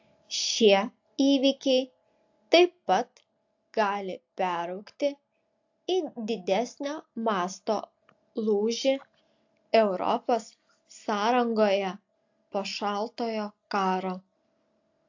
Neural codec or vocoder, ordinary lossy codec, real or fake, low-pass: none; AAC, 48 kbps; real; 7.2 kHz